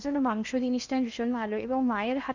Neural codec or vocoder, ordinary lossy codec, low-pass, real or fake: codec, 16 kHz in and 24 kHz out, 0.8 kbps, FocalCodec, streaming, 65536 codes; none; 7.2 kHz; fake